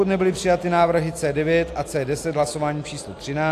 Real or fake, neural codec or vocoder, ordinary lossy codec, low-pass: real; none; AAC, 64 kbps; 14.4 kHz